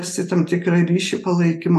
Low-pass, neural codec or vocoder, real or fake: 14.4 kHz; none; real